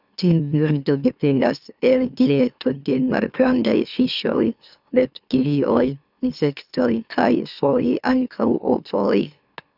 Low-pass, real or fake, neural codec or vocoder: 5.4 kHz; fake; autoencoder, 44.1 kHz, a latent of 192 numbers a frame, MeloTTS